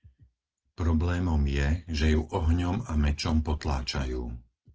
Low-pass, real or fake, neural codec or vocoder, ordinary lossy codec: 7.2 kHz; real; none; Opus, 16 kbps